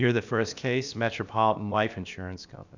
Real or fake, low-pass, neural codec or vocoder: fake; 7.2 kHz; codec, 16 kHz, about 1 kbps, DyCAST, with the encoder's durations